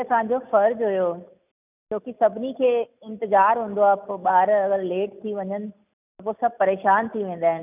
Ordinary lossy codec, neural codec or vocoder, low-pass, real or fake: none; none; 3.6 kHz; real